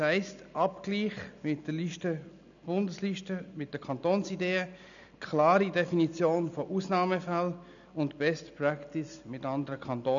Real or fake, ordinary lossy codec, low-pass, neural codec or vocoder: real; none; 7.2 kHz; none